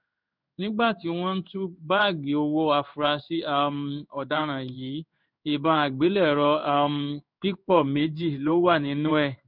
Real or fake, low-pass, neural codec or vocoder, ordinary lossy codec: fake; 5.4 kHz; codec, 16 kHz in and 24 kHz out, 1 kbps, XY-Tokenizer; none